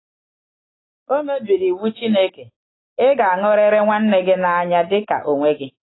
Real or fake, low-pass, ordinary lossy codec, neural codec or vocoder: real; 7.2 kHz; AAC, 16 kbps; none